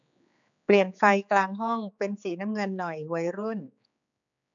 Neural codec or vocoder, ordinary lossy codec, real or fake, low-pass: codec, 16 kHz, 4 kbps, X-Codec, HuBERT features, trained on general audio; none; fake; 7.2 kHz